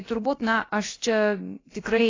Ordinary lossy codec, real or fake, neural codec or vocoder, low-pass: AAC, 32 kbps; fake; codec, 16 kHz, about 1 kbps, DyCAST, with the encoder's durations; 7.2 kHz